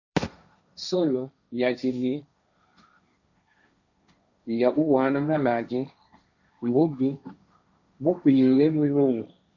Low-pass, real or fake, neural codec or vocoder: 7.2 kHz; fake; codec, 16 kHz, 1.1 kbps, Voila-Tokenizer